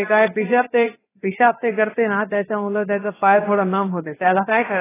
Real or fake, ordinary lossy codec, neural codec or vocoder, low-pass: fake; AAC, 16 kbps; codec, 16 kHz, about 1 kbps, DyCAST, with the encoder's durations; 3.6 kHz